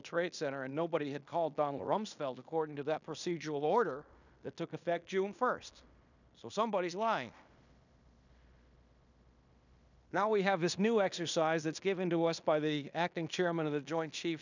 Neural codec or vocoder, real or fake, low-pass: codec, 16 kHz in and 24 kHz out, 0.9 kbps, LongCat-Audio-Codec, fine tuned four codebook decoder; fake; 7.2 kHz